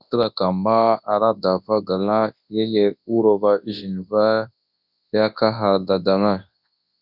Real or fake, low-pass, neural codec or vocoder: fake; 5.4 kHz; codec, 24 kHz, 0.9 kbps, WavTokenizer, large speech release